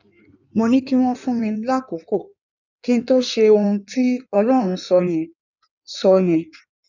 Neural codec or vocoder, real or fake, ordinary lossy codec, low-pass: codec, 16 kHz in and 24 kHz out, 1.1 kbps, FireRedTTS-2 codec; fake; none; 7.2 kHz